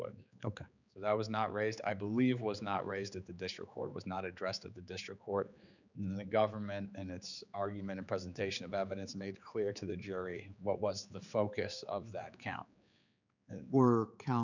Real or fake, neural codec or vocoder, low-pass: fake; codec, 16 kHz, 4 kbps, X-Codec, HuBERT features, trained on general audio; 7.2 kHz